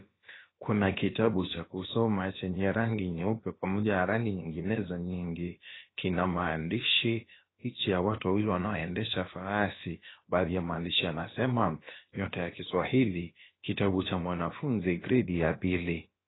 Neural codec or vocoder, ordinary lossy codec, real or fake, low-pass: codec, 16 kHz, about 1 kbps, DyCAST, with the encoder's durations; AAC, 16 kbps; fake; 7.2 kHz